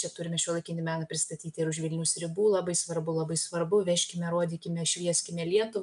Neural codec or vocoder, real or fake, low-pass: none; real; 10.8 kHz